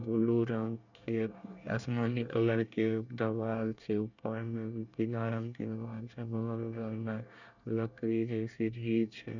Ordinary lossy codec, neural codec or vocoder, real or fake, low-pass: none; codec, 24 kHz, 1 kbps, SNAC; fake; 7.2 kHz